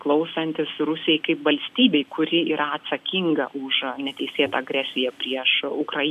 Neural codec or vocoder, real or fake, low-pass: none; real; 14.4 kHz